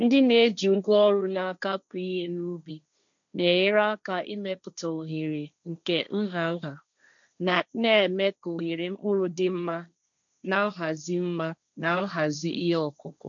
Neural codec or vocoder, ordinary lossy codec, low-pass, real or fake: codec, 16 kHz, 1.1 kbps, Voila-Tokenizer; none; 7.2 kHz; fake